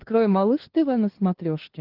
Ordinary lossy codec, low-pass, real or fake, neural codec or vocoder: Opus, 16 kbps; 5.4 kHz; fake; codec, 16 kHz, 2 kbps, FunCodec, trained on LibriTTS, 25 frames a second